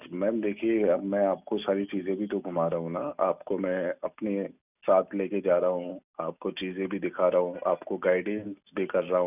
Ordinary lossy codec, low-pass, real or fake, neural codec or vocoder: none; 3.6 kHz; real; none